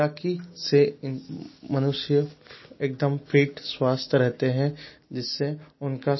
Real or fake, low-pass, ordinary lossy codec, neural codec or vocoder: real; 7.2 kHz; MP3, 24 kbps; none